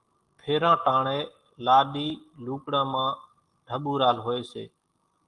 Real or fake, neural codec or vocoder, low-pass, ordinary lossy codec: real; none; 10.8 kHz; Opus, 24 kbps